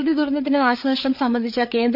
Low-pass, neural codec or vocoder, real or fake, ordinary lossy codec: 5.4 kHz; codec, 16 kHz, 8 kbps, FreqCodec, larger model; fake; none